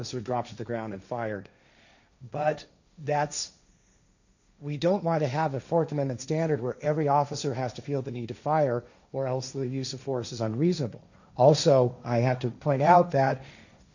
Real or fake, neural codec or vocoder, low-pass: fake; codec, 16 kHz, 1.1 kbps, Voila-Tokenizer; 7.2 kHz